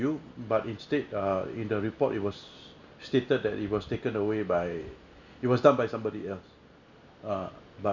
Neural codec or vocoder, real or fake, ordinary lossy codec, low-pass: none; real; none; 7.2 kHz